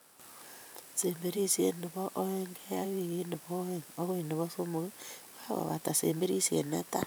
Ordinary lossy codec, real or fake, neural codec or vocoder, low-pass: none; fake; vocoder, 44.1 kHz, 128 mel bands every 512 samples, BigVGAN v2; none